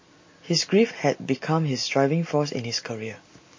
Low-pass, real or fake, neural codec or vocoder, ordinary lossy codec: 7.2 kHz; real; none; MP3, 32 kbps